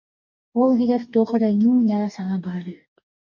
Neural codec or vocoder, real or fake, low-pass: codec, 44.1 kHz, 2.6 kbps, DAC; fake; 7.2 kHz